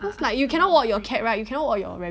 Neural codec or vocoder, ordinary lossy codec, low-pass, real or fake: none; none; none; real